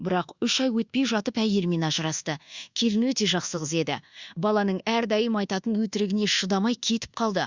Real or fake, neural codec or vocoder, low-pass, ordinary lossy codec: fake; codec, 24 kHz, 1.2 kbps, DualCodec; 7.2 kHz; Opus, 64 kbps